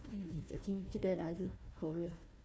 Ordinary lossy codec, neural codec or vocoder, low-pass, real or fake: none; codec, 16 kHz, 1 kbps, FunCodec, trained on Chinese and English, 50 frames a second; none; fake